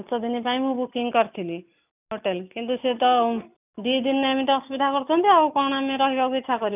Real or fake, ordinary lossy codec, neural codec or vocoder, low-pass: real; none; none; 3.6 kHz